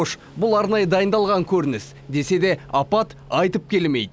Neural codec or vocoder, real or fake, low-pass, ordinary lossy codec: none; real; none; none